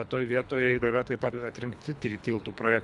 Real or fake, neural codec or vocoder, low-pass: fake; codec, 24 kHz, 3 kbps, HILCodec; 10.8 kHz